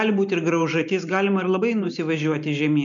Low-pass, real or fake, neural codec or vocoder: 7.2 kHz; real; none